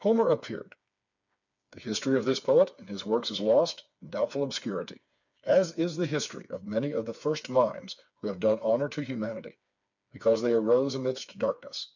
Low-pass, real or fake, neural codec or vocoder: 7.2 kHz; fake; codec, 16 kHz, 4 kbps, FreqCodec, smaller model